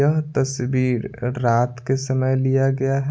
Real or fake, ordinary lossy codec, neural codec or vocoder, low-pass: real; none; none; none